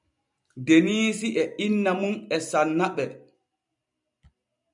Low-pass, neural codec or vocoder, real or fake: 10.8 kHz; none; real